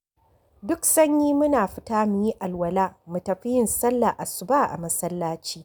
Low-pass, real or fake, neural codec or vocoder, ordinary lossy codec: none; real; none; none